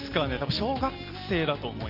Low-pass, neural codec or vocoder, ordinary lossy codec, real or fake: 5.4 kHz; none; Opus, 32 kbps; real